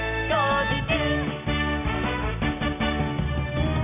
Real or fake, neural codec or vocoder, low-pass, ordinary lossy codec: real; none; 3.6 kHz; none